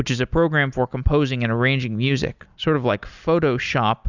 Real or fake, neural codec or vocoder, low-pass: real; none; 7.2 kHz